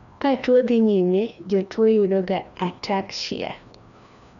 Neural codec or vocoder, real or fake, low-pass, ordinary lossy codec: codec, 16 kHz, 1 kbps, FreqCodec, larger model; fake; 7.2 kHz; none